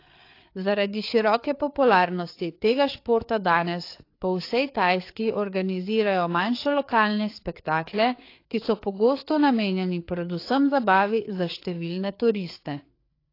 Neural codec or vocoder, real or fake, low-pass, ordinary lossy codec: codec, 16 kHz, 4 kbps, FreqCodec, larger model; fake; 5.4 kHz; AAC, 32 kbps